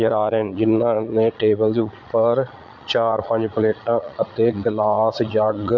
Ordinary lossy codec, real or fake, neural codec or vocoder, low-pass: MP3, 48 kbps; fake; vocoder, 22.05 kHz, 80 mel bands, Vocos; 7.2 kHz